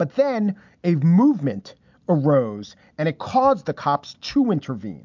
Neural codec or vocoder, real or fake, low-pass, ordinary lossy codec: none; real; 7.2 kHz; MP3, 64 kbps